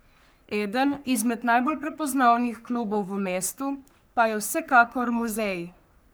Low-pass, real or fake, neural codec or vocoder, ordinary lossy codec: none; fake; codec, 44.1 kHz, 3.4 kbps, Pupu-Codec; none